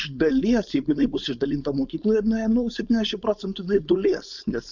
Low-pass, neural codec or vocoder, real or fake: 7.2 kHz; codec, 16 kHz, 4.8 kbps, FACodec; fake